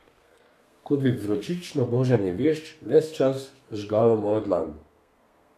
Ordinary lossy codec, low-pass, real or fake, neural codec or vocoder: none; 14.4 kHz; fake; codec, 32 kHz, 1.9 kbps, SNAC